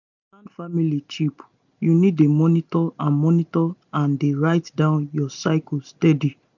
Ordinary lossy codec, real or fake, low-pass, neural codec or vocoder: none; real; 7.2 kHz; none